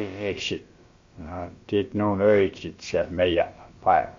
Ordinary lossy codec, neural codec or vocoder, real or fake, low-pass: MP3, 48 kbps; codec, 16 kHz, about 1 kbps, DyCAST, with the encoder's durations; fake; 7.2 kHz